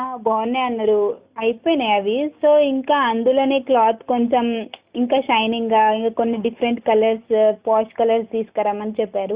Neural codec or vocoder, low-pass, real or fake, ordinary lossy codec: none; 3.6 kHz; real; Opus, 64 kbps